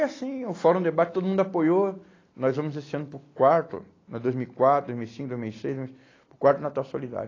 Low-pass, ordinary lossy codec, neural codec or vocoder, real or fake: 7.2 kHz; AAC, 32 kbps; none; real